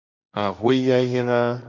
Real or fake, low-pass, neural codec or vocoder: fake; 7.2 kHz; codec, 16 kHz, 1.1 kbps, Voila-Tokenizer